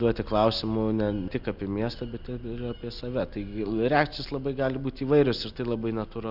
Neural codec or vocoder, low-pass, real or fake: none; 5.4 kHz; real